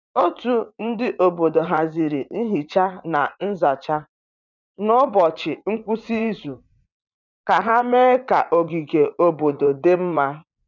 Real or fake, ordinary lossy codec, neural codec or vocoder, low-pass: fake; none; vocoder, 44.1 kHz, 80 mel bands, Vocos; 7.2 kHz